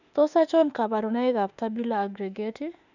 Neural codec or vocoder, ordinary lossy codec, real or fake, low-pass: autoencoder, 48 kHz, 32 numbers a frame, DAC-VAE, trained on Japanese speech; none; fake; 7.2 kHz